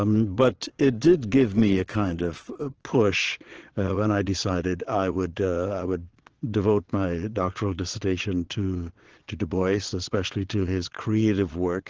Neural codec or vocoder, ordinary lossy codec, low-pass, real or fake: vocoder, 22.05 kHz, 80 mel bands, Vocos; Opus, 16 kbps; 7.2 kHz; fake